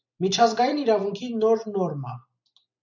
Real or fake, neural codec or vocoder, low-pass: real; none; 7.2 kHz